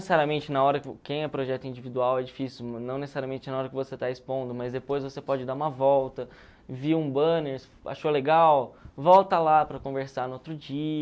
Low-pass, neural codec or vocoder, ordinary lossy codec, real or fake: none; none; none; real